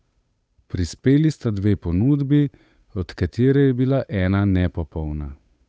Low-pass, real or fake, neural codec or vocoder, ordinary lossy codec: none; fake; codec, 16 kHz, 8 kbps, FunCodec, trained on Chinese and English, 25 frames a second; none